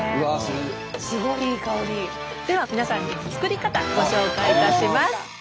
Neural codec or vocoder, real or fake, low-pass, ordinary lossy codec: none; real; none; none